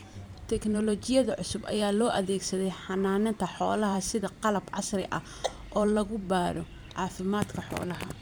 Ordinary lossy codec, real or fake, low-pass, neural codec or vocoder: none; fake; none; vocoder, 44.1 kHz, 128 mel bands every 512 samples, BigVGAN v2